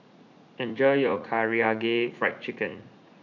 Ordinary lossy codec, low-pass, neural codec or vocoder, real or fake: none; 7.2 kHz; vocoder, 44.1 kHz, 80 mel bands, Vocos; fake